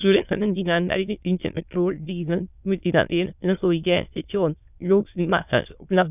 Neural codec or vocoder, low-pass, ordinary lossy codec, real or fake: autoencoder, 22.05 kHz, a latent of 192 numbers a frame, VITS, trained on many speakers; 3.6 kHz; none; fake